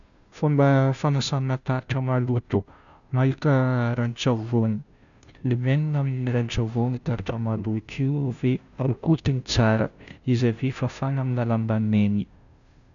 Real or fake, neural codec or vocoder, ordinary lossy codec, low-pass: fake; codec, 16 kHz, 0.5 kbps, FunCodec, trained on Chinese and English, 25 frames a second; none; 7.2 kHz